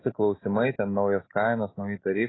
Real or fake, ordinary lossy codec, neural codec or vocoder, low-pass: real; AAC, 16 kbps; none; 7.2 kHz